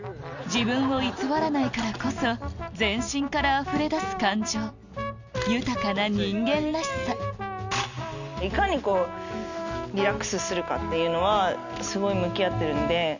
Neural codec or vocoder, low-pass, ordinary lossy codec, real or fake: none; 7.2 kHz; none; real